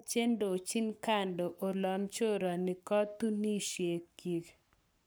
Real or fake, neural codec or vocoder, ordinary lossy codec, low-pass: fake; codec, 44.1 kHz, 7.8 kbps, Pupu-Codec; none; none